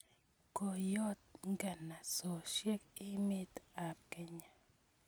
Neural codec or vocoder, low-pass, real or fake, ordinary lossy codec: none; none; real; none